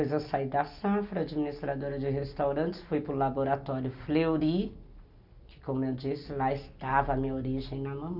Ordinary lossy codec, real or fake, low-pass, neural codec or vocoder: none; real; 5.4 kHz; none